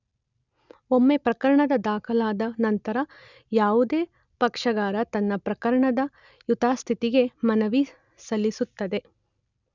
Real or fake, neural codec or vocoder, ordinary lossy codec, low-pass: real; none; none; 7.2 kHz